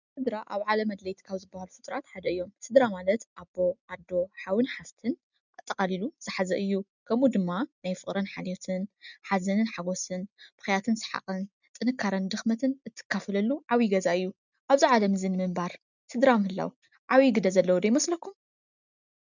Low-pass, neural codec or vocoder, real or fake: 7.2 kHz; none; real